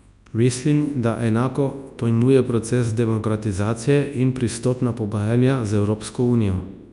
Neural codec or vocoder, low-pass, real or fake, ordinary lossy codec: codec, 24 kHz, 0.9 kbps, WavTokenizer, large speech release; 10.8 kHz; fake; none